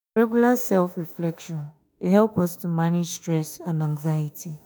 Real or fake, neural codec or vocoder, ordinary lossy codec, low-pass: fake; autoencoder, 48 kHz, 32 numbers a frame, DAC-VAE, trained on Japanese speech; none; none